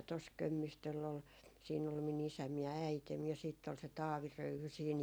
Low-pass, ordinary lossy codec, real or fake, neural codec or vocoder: none; none; real; none